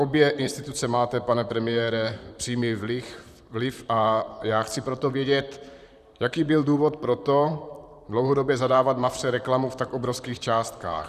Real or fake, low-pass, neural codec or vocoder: fake; 14.4 kHz; vocoder, 44.1 kHz, 128 mel bands, Pupu-Vocoder